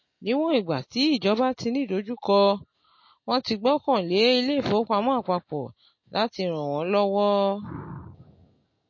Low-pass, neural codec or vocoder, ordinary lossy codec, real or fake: 7.2 kHz; none; MP3, 32 kbps; real